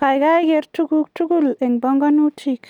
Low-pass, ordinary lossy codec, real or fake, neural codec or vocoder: 19.8 kHz; none; real; none